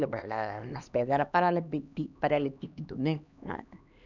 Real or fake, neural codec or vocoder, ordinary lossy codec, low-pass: fake; codec, 16 kHz, 2 kbps, X-Codec, HuBERT features, trained on LibriSpeech; none; 7.2 kHz